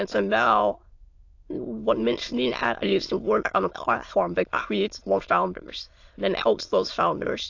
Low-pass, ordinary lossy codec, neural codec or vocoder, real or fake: 7.2 kHz; AAC, 48 kbps; autoencoder, 22.05 kHz, a latent of 192 numbers a frame, VITS, trained on many speakers; fake